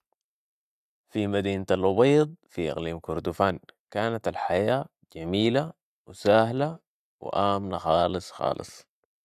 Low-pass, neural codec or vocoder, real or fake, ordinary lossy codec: 14.4 kHz; none; real; AAC, 96 kbps